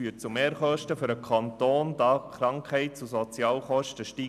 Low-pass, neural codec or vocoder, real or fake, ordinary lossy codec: 14.4 kHz; none; real; none